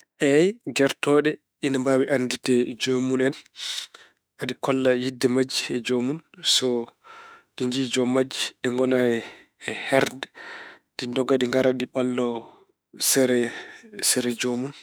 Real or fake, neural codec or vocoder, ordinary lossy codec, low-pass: fake; autoencoder, 48 kHz, 32 numbers a frame, DAC-VAE, trained on Japanese speech; none; none